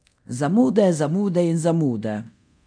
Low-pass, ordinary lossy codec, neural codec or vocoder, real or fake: 9.9 kHz; none; codec, 24 kHz, 0.9 kbps, DualCodec; fake